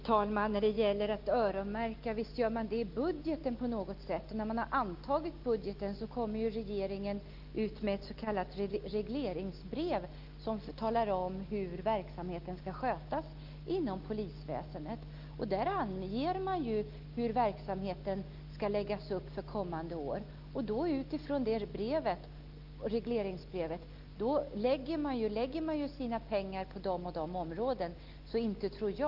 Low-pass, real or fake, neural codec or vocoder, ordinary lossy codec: 5.4 kHz; real; none; Opus, 32 kbps